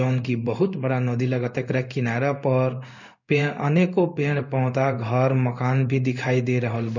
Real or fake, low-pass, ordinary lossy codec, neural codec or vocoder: fake; 7.2 kHz; none; codec, 16 kHz in and 24 kHz out, 1 kbps, XY-Tokenizer